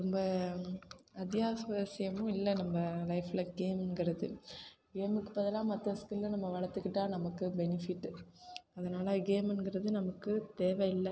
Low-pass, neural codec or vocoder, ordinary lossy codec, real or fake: none; none; none; real